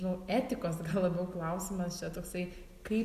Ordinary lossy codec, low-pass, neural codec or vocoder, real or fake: Opus, 64 kbps; 14.4 kHz; none; real